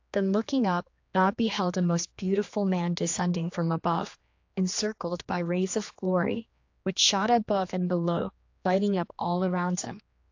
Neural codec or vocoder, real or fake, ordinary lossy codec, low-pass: codec, 16 kHz, 2 kbps, X-Codec, HuBERT features, trained on general audio; fake; AAC, 48 kbps; 7.2 kHz